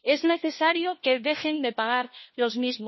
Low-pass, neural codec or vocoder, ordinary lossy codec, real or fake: 7.2 kHz; codec, 16 kHz, 1 kbps, FunCodec, trained on LibriTTS, 50 frames a second; MP3, 24 kbps; fake